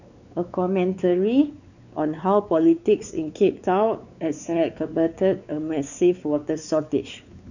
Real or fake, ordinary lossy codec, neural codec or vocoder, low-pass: fake; none; codec, 16 kHz, 4 kbps, X-Codec, WavLM features, trained on Multilingual LibriSpeech; 7.2 kHz